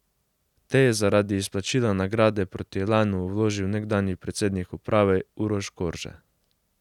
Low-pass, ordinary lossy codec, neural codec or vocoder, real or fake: 19.8 kHz; none; none; real